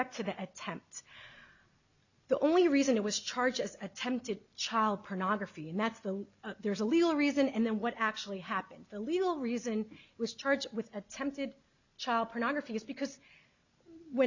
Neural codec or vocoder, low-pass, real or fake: none; 7.2 kHz; real